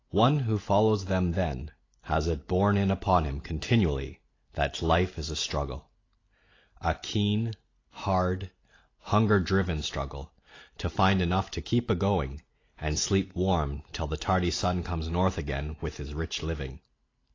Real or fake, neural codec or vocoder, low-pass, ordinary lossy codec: real; none; 7.2 kHz; AAC, 32 kbps